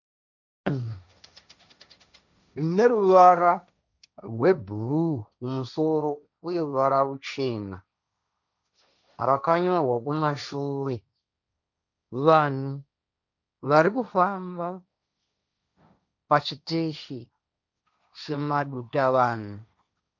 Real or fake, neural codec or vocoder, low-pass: fake; codec, 16 kHz, 1.1 kbps, Voila-Tokenizer; 7.2 kHz